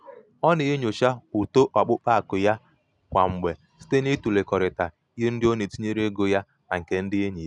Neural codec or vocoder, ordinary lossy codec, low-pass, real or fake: none; none; 10.8 kHz; real